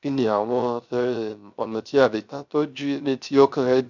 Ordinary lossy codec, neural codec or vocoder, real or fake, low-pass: none; codec, 16 kHz, 0.3 kbps, FocalCodec; fake; 7.2 kHz